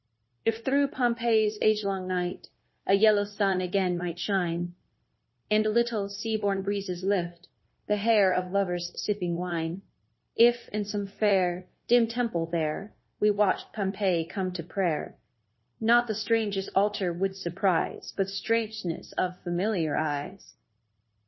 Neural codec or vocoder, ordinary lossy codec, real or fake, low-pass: codec, 16 kHz, 0.9 kbps, LongCat-Audio-Codec; MP3, 24 kbps; fake; 7.2 kHz